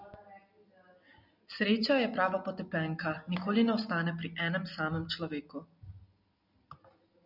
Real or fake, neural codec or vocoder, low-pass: real; none; 5.4 kHz